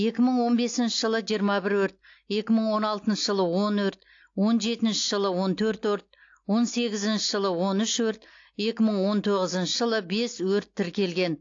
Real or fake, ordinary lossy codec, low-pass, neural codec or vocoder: real; AAC, 48 kbps; 7.2 kHz; none